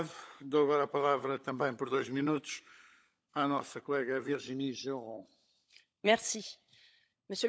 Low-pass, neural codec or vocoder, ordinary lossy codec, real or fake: none; codec, 16 kHz, 16 kbps, FunCodec, trained on LibriTTS, 50 frames a second; none; fake